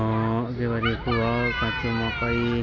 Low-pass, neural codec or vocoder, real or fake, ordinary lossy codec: 7.2 kHz; none; real; none